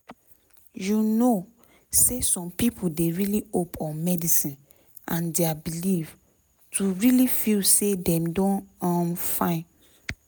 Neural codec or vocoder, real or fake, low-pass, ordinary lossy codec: none; real; none; none